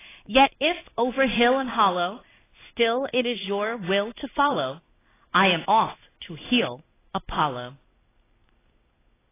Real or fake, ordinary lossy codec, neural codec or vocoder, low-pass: fake; AAC, 16 kbps; codec, 16 kHz in and 24 kHz out, 1 kbps, XY-Tokenizer; 3.6 kHz